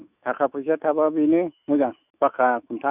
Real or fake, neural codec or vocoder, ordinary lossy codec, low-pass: fake; autoencoder, 48 kHz, 128 numbers a frame, DAC-VAE, trained on Japanese speech; none; 3.6 kHz